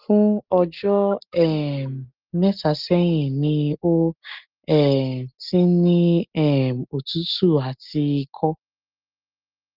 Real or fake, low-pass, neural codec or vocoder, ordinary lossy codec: real; 5.4 kHz; none; Opus, 32 kbps